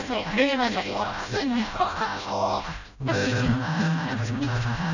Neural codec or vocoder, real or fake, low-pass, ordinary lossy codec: codec, 16 kHz, 0.5 kbps, FreqCodec, smaller model; fake; 7.2 kHz; none